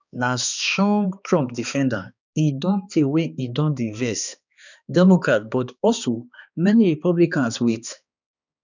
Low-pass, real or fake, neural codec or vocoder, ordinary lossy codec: 7.2 kHz; fake; codec, 16 kHz, 2 kbps, X-Codec, HuBERT features, trained on balanced general audio; none